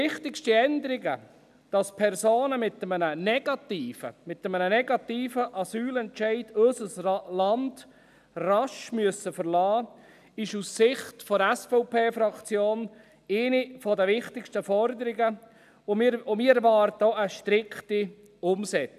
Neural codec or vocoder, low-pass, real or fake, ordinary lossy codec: none; 14.4 kHz; real; none